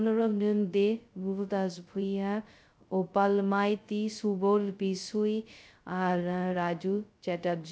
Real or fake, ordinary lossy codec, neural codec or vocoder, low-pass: fake; none; codec, 16 kHz, 0.2 kbps, FocalCodec; none